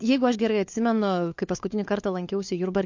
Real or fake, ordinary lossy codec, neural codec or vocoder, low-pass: fake; MP3, 48 kbps; codec, 16 kHz, 4 kbps, FunCodec, trained on LibriTTS, 50 frames a second; 7.2 kHz